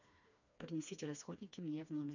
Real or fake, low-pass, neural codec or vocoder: fake; 7.2 kHz; codec, 16 kHz, 2 kbps, FreqCodec, smaller model